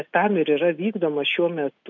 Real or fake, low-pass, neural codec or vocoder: real; 7.2 kHz; none